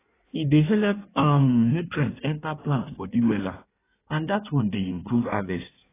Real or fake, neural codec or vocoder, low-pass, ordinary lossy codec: fake; codec, 16 kHz in and 24 kHz out, 1.1 kbps, FireRedTTS-2 codec; 3.6 kHz; AAC, 16 kbps